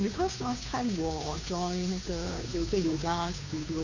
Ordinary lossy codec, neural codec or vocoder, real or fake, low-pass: none; codec, 16 kHz, 2 kbps, FunCodec, trained on Chinese and English, 25 frames a second; fake; 7.2 kHz